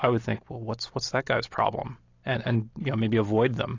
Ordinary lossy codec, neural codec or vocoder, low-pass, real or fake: AAC, 48 kbps; none; 7.2 kHz; real